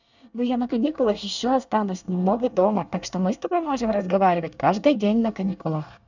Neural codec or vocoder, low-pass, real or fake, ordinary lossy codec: codec, 24 kHz, 1 kbps, SNAC; 7.2 kHz; fake; none